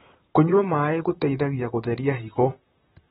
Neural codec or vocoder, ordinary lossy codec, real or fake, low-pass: vocoder, 44.1 kHz, 128 mel bands, Pupu-Vocoder; AAC, 16 kbps; fake; 19.8 kHz